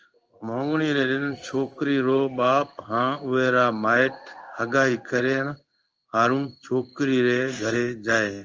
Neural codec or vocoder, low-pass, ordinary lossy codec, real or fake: codec, 16 kHz in and 24 kHz out, 1 kbps, XY-Tokenizer; 7.2 kHz; Opus, 24 kbps; fake